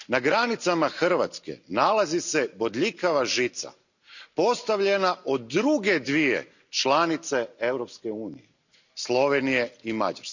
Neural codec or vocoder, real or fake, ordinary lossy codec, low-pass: none; real; none; 7.2 kHz